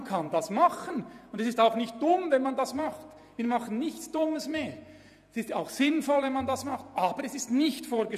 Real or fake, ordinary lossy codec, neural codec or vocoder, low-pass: fake; none; vocoder, 48 kHz, 128 mel bands, Vocos; 14.4 kHz